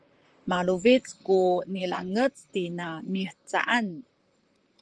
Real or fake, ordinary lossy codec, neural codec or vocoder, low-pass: fake; Opus, 24 kbps; vocoder, 44.1 kHz, 128 mel bands, Pupu-Vocoder; 9.9 kHz